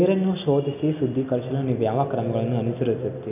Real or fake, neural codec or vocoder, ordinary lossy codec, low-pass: real; none; none; 3.6 kHz